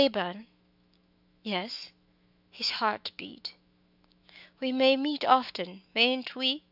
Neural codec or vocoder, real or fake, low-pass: autoencoder, 48 kHz, 128 numbers a frame, DAC-VAE, trained on Japanese speech; fake; 5.4 kHz